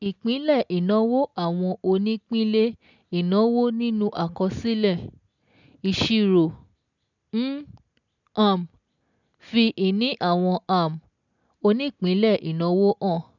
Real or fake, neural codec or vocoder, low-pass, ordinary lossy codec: real; none; 7.2 kHz; none